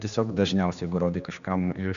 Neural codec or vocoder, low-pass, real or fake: codec, 16 kHz, 2 kbps, X-Codec, HuBERT features, trained on general audio; 7.2 kHz; fake